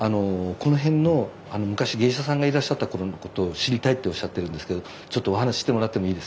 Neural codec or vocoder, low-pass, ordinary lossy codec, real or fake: none; none; none; real